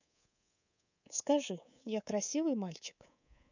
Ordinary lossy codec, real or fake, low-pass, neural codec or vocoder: none; fake; 7.2 kHz; codec, 24 kHz, 3.1 kbps, DualCodec